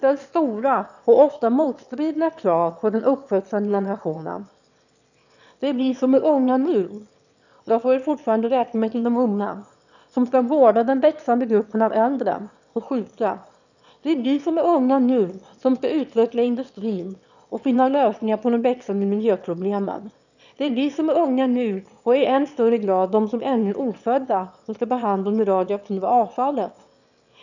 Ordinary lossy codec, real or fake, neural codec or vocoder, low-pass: none; fake; autoencoder, 22.05 kHz, a latent of 192 numbers a frame, VITS, trained on one speaker; 7.2 kHz